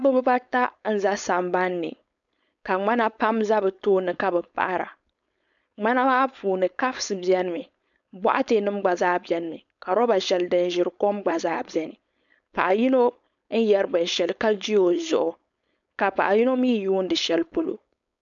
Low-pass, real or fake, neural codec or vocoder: 7.2 kHz; fake; codec, 16 kHz, 4.8 kbps, FACodec